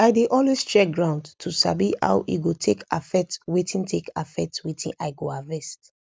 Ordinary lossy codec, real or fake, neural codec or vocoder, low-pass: none; real; none; none